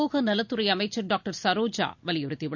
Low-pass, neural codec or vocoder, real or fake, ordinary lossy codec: 7.2 kHz; none; real; none